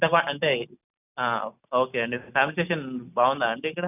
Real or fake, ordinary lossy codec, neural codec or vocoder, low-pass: real; none; none; 3.6 kHz